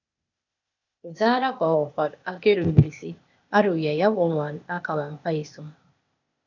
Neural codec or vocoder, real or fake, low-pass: codec, 16 kHz, 0.8 kbps, ZipCodec; fake; 7.2 kHz